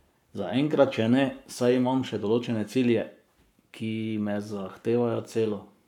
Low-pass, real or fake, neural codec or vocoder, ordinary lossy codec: 19.8 kHz; fake; codec, 44.1 kHz, 7.8 kbps, Pupu-Codec; none